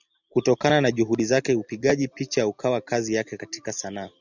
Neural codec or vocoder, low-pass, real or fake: none; 7.2 kHz; real